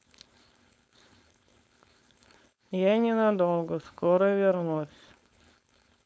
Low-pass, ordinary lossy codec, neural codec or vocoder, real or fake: none; none; codec, 16 kHz, 4.8 kbps, FACodec; fake